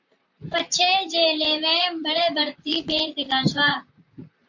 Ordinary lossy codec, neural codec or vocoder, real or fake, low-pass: MP3, 48 kbps; vocoder, 44.1 kHz, 128 mel bands, Pupu-Vocoder; fake; 7.2 kHz